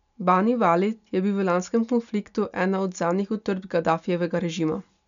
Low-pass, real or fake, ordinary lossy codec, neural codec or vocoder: 7.2 kHz; real; MP3, 96 kbps; none